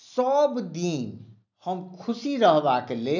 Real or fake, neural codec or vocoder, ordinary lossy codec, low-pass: real; none; none; 7.2 kHz